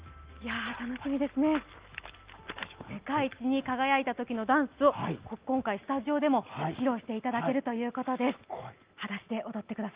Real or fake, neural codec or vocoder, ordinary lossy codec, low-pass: real; none; Opus, 32 kbps; 3.6 kHz